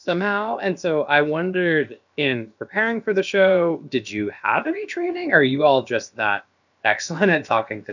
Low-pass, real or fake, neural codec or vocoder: 7.2 kHz; fake; codec, 16 kHz, about 1 kbps, DyCAST, with the encoder's durations